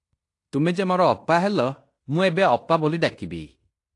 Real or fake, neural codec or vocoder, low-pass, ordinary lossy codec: fake; codec, 16 kHz in and 24 kHz out, 0.9 kbps, LongCat-Audio-Codec, fine tuned four codebook decoder; 10.8 kHz; AAC, 48 kbps